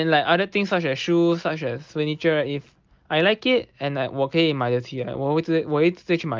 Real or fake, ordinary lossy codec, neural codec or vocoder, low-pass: real; Opus, 32 kbps; none; 7.2 kHz